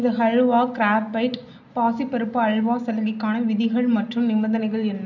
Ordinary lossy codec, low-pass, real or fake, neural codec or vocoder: none; 7.2 kHz; real; none